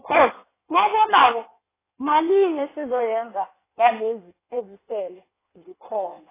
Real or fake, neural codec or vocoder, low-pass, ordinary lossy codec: fake; codec, 16 kHz in and 24 kHz out, 1.1 kbps, FireRedTTS-2 codec; 3.6 kHz; AAC, 16 kbps